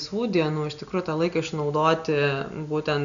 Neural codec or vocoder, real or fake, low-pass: none; real; 7.2 kHz